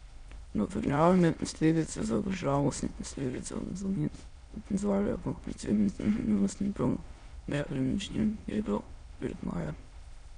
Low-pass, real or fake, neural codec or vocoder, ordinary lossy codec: 9.9 kHz; fake; autoencoder, 22.05 kHz, a latent of 192 numbers a frame, VITS, trained on many speakers; none